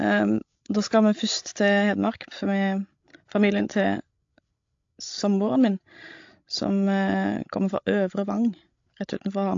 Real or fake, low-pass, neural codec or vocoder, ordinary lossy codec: fake; 7.2 kHz; codec, 16 kHz, 16 kbps, FreqCodec, larger model; AAC, 48 kbps